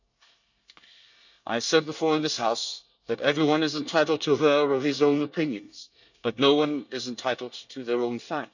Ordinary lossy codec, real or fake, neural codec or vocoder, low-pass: none; fake; codec, 24 kHz, 1 kbps, SNAC; 7.2 kHz